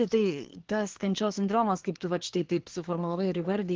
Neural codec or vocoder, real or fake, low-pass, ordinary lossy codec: codec, 24 kHz, 1 kbps, SNAC; fake; 7.2 kHz; Opus, 16 kbps